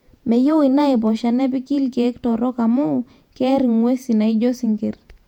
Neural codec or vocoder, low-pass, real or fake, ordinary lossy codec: vocoder, 48 kHz, 128 mel bands, Vocos; 19.8 kHz; fake; none